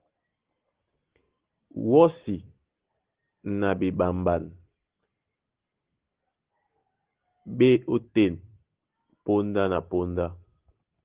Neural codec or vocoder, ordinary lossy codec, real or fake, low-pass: none; Opus, 16 kbps; real; 3.6 kHz